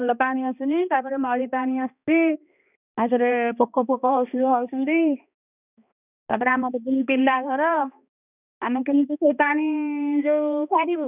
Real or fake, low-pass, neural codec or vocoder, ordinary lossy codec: fake; 3.6 kHz; codec, 16 kHz, 2 kbps, X-Codec, HuBERT features, trained on balanced general audio; none